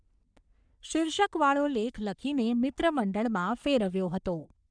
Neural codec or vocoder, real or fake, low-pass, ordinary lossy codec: codec, 44.1 kHz, 3.4 kbps, Pupu-Codec; fake; 9.9 kHz; none